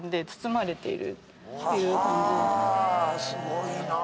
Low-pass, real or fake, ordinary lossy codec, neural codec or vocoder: none; real; none; none